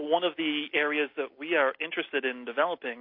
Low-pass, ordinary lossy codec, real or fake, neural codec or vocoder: 5.4 kHz; MP3, 32 kbps; real; none